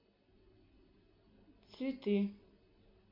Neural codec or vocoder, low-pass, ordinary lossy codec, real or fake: none; 5.4 kHz; MP3, 32 kbps; real